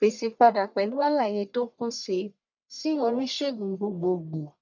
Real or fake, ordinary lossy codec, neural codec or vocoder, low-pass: fake; none; codec, 44.1 kHz, 1.7 kbps, Pupu-Codec; 7.2 kHz